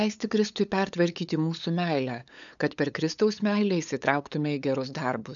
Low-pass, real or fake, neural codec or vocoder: 7.2 kHz; fake; codec, 16 kHz, 8 kbps, FunCodec, trained on LibriTTS, 25 frames a second